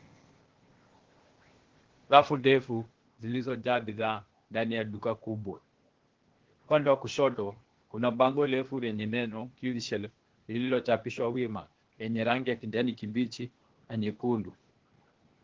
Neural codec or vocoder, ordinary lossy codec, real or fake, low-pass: codec, 16 kHz, 0.8 kbps, ZipCodec; Opus, 16 kbps; fake; 7.2 kHz